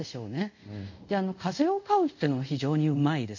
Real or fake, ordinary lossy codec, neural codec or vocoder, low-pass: fake; none; codec, 24 kHz, 0.5 kbps, DualCodec; 7.2 kHz